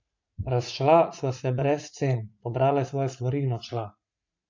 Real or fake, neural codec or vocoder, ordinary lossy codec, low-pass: fake; vocoder, 44.1 kHz, 80 mel bands, Vocos; MP3, 64 kbps; 7.2 kHz